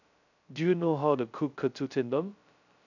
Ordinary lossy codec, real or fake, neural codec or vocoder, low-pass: none; fake; codec, 16 kHz, 0.2 kbps, FocalCodec; 7.2 kHz